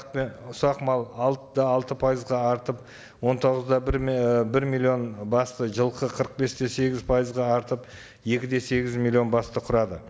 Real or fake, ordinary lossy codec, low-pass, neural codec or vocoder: real; none; none; none